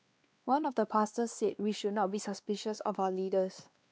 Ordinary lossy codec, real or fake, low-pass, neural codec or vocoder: none; fake; none; codec, 16 kHz, 2 kbps, X-Codec, WavLM features, trained on Multilingual LibriSpeech